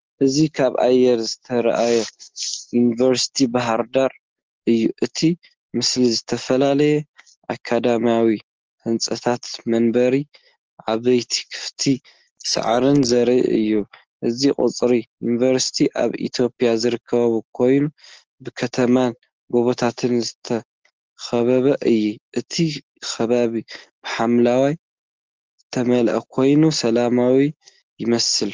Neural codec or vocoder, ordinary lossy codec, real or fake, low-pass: none; Opus, 16 kbps; real; 7.2 kHz